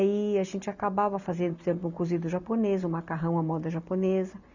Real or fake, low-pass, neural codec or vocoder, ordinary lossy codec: real; 7.2 kHz; none; none